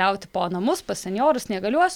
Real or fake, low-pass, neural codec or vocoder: real; 19.8 kHz; none